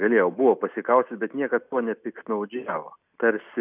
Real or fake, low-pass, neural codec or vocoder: real; 3.6 kHz; none